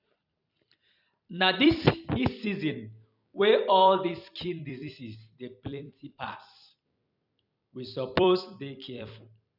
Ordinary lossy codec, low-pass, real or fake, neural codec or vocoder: none; 5.4 kHz; fake; vocoder, 44.1 kHz, 128 mel bands every 256 samples, BigVGAN v2